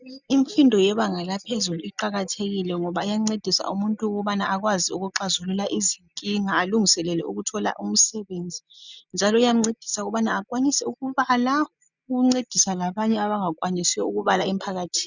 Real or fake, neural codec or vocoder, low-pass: real; none; 7.2 kHz